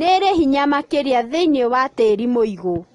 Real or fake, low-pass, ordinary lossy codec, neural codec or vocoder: real; 10.8 kHz; AAC, 32 kbps; none